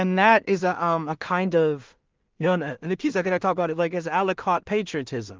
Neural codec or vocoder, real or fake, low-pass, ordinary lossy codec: codec, 16 kHz in and 24 kHz out, 0.4 kbps, LongCat-Audio-Codec, two codebook decoder; fake; 7.2 kHz; Opus, 32 kbps